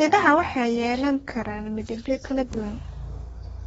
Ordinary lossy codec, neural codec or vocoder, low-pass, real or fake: AAC, 24 kbps; codec, 32 kHz, 1.9 kbps, SNAC; 14.4 kHz; fake